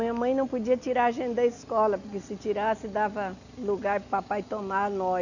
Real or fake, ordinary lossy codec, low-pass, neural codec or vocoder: real; none; 7.2 kHz; none